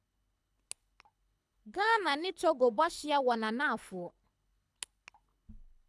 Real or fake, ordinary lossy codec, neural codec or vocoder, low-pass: fake; none; codec, 24 kHz, 6 kbps, HILCodec; none